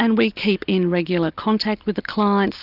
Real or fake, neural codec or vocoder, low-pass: real; none; 5.4 kHz